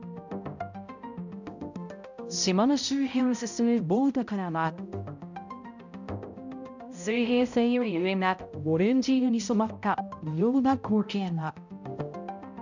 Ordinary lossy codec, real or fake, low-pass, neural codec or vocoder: none; fake; 7.2 kHz; codec, 16 kHz, 0.5 kbps, X-Codec, HuBERT features, trained on balanced general audio